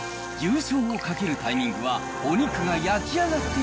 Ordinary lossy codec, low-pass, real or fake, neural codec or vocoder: none; none; real; none